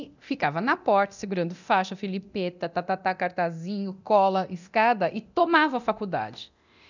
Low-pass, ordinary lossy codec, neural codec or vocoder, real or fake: 7.2 kHz; none; codec, 24 kHz, 0.9 kbps, DualCodec; fake